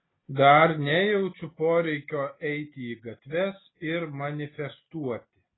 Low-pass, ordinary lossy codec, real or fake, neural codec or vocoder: 7.2 kHz; AAC, 16 kbps; real; none